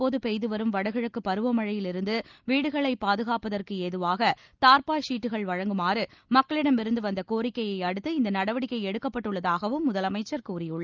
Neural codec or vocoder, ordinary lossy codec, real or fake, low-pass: none; Opus, 24 kbps; real; 7.2 kHz